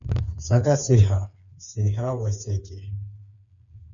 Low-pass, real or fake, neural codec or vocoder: 7.2 kHz; fake; codec, 16 kHz, 4 kbps, FreqCodec, smaller model